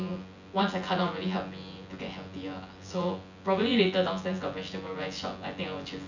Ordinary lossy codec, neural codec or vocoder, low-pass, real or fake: none; vocoder, 24 kHz, 100 mel bands, Vocos; 7.2 kHz; fake